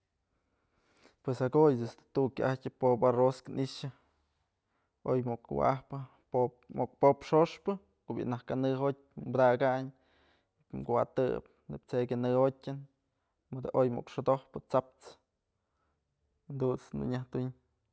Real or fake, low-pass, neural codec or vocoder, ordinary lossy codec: real; none; none; none